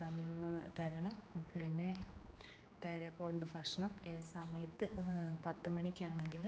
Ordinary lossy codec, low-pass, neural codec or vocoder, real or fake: none; none; codec, 16 kHz, 2 kbps, X-Codec, HuBERT features, trained on general audio; fake